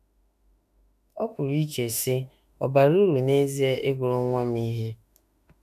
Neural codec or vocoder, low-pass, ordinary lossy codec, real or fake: autoencoder, 48 kHz, 32 numbers a frame, DAC-VAE, trained on Japanese speech; 14.4 kHz; none; fake